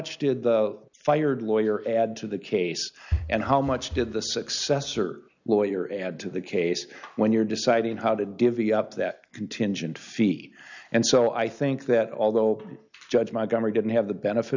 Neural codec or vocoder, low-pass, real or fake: none; 7.2 kHz; real